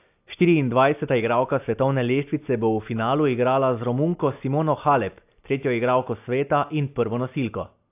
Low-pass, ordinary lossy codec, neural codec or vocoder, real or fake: 3.6 kHz; AAC, 32 kbps; none; real